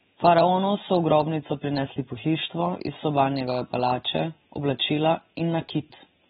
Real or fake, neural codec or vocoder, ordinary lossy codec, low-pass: fake; vocoder, 44.1 kHz, 128 mel bands every 512 samples, BigVGAN v2; AAC, 16 kbps; 19.8 kHz